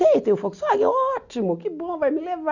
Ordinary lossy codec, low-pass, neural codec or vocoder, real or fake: none; 7.2 kHz; none; real